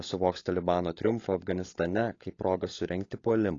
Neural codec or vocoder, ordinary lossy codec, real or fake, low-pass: codec, 16 kHz, 16 kbps, FunCodec, trained on LibriTTS, 50 frames a second; AAC, 32 kbps; fake; 7.2 kHz